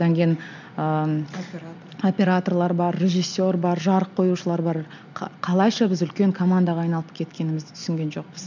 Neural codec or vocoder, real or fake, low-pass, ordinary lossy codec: none; real; 7.2 kHz; none